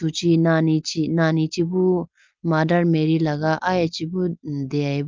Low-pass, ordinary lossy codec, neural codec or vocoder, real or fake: 7.2 kHz; Opus, 24 kbps; none; real